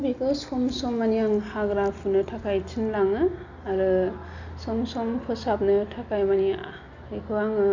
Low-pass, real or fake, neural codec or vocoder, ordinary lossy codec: 7.2 kHz; real; none; none